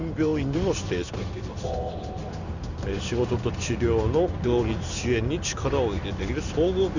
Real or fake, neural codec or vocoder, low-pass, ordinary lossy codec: fake; codec, 16 kHz in and 24 kHz out, 1 kbps, XY-Tokenizer; 7.2 kHz; none